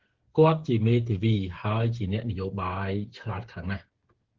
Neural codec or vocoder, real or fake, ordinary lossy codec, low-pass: codec, 16 kHz, 8 kbps, FreqCodec, smaller model; fake; Opus, 16 kbps; 7.2 kHz